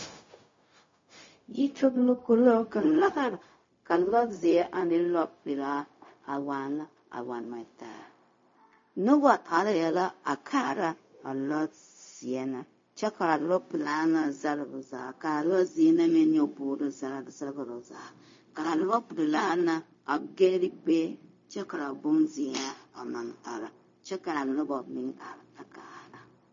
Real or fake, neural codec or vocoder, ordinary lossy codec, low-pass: fake; codec, 16 kHz, 0.4 kbps, LongCat-Audio-Codec; MP3, 32 kbps; 7.2 kHz